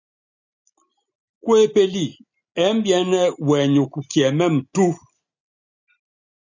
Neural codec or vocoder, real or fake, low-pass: none; real; 7.2 kHz